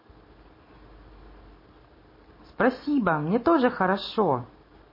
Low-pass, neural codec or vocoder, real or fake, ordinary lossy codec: 5.4 kHz; none; real; MP3, 24 kbps